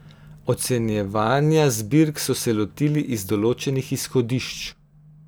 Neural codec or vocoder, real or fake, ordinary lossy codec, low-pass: none; real; none; none